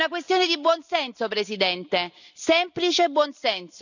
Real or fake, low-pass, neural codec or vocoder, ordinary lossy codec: real; 7.2 kHz; none; none